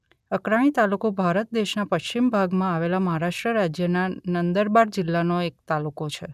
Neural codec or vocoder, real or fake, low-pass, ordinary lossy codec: none; real; 14.4 kHz; none